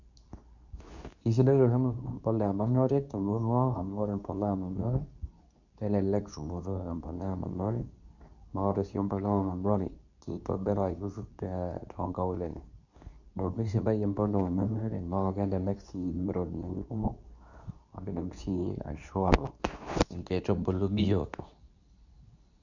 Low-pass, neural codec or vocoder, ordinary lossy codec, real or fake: 7.2 kHz; codec, 24 kHz, 0.9 kbps, WavTokenizer, medium speech release version 2; none; fake